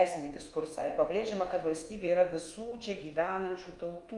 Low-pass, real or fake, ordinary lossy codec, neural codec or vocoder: 10.8 kHz; fake; Opus, 32 kbps; codec, 24 kHz, 1.2 kbps, DualCodec